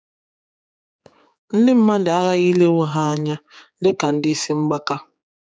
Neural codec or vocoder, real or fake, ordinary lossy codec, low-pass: codec, 16 kHz, 4 kbps, X-Codec, HuBERT features, trained on general audio; fake; none; none